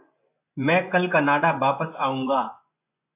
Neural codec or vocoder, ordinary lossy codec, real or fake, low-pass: vocoder, 24 kHz, 100 mel bands, Vocos; AAC, 24 kbps; fake; 3.6 kHz